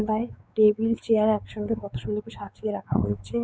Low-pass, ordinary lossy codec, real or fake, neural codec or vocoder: none; none; fake; codec, 16 kHz, 4 kbps, X-Codec, WavLM features, trained on Multilingual LibriSpeech